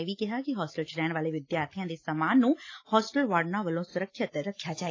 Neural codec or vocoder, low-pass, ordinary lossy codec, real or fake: none; 7.2 kHz; AAC, 32 kbps; real